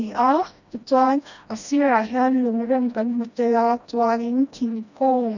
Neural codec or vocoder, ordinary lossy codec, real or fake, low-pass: codec, 16 kHz, 1 kbps, FreqCodec, smaller model; none; fake; 7.2 kHz